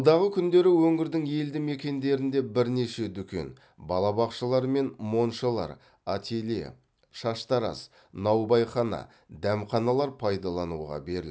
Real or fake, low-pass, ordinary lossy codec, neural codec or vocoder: real; none; none; none